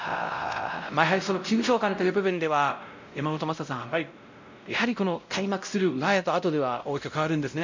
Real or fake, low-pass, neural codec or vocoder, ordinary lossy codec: fake; 7.2 kHz; codec, 16 kHz, 0.5 kbps, X-Codec, WavLM features, trained on Multilingual LibriSpeech; MP3, 64 kbps